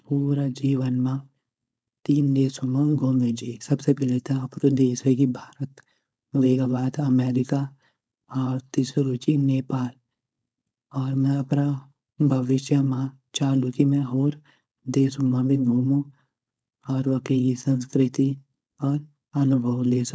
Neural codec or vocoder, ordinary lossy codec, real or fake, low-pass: codec, 16 kHz, 4.8 kbps, FACodec; none; fake; none